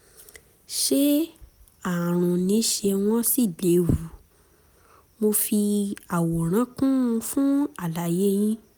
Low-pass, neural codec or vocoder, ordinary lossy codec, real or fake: none; none; none; real